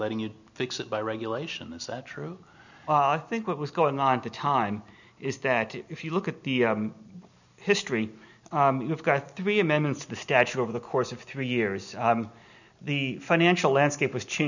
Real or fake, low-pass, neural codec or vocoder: real; 7.2 kHz; none